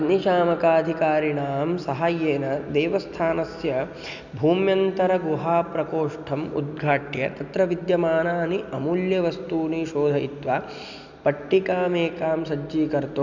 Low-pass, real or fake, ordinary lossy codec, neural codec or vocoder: 7.2 kHz; real; none; none